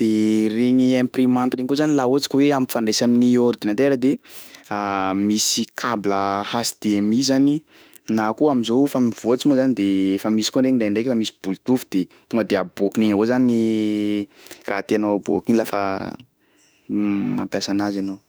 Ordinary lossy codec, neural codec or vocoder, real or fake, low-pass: none; autoencoder, 48 kHz, 32 numbers a frame, DAC-VAE, trained on Japanese speech; fake; none